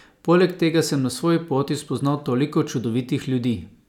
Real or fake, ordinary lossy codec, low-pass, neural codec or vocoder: real; none; 19.8 kHz; none